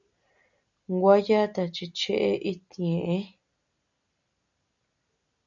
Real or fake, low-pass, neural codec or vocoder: real; 7.2 kHz; none